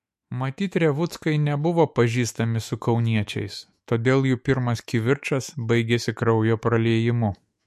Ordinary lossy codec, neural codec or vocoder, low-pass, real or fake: MP3, 64 kbps; autoencoder, 48 kHz, 128 numbers a frame, DAC-VAE, trained on Japanese speech; 14.4 kHz; fake